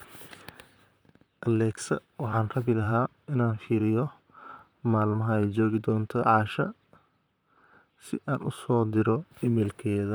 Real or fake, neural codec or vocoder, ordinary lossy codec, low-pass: fake; vocoder, 44.1 kHz, 128 mel bands, Pupu-Vocoder; none; none